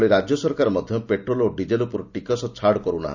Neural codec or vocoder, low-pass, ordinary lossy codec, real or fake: none; 7.2 kHz; none; real